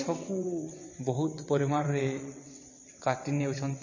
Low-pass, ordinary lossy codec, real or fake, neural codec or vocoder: 7.2 kHz; MP3, 32 kbps; fake; vocoder, 22.05 kHz, 80 mel bands, WaveNeXt